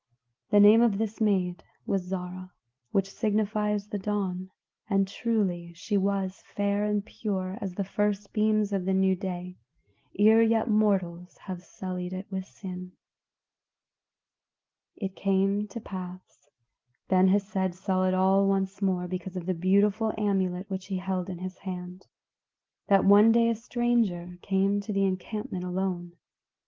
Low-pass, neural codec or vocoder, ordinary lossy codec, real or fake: 7.2 kHz; none; Opus, 16 kbps; real